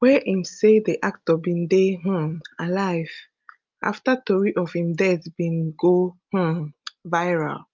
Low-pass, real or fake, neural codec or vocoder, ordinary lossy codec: 7.2 kHz; real; none; Opus, 24 kbps